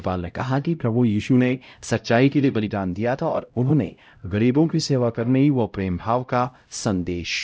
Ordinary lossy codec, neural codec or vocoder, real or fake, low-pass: none; codec, 16 kHz, 0.5 kbps, X-Codec, HuBERT features, trained on LibriSpeech; fake; none